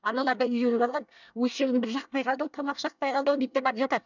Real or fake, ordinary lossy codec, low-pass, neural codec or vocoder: fake; none; 7.2 kHz; codec, 24 kHz, 1 kbps, SNAC